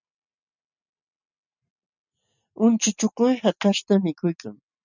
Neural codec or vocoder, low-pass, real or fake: none; 7.2 kHz; real